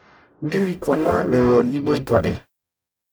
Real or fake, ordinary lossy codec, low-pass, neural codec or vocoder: fake; none; none; codec, 44.1 kHz, 0.9 kbps, DAC